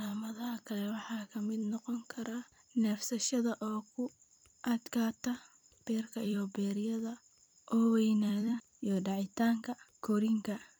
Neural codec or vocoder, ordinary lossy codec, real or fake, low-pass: vocoder, 44.1 kHz, 128 mel bands every 256 samples, BigVGAN v2; none; fake; none